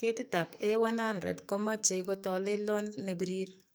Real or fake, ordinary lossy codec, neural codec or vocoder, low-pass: fake; none; codec, 44.1 kHz, 2.6 kbps, SNAC; none